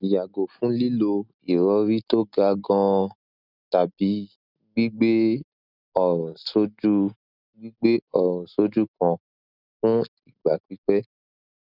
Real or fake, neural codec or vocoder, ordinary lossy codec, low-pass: real; none; none; 5.4 kHz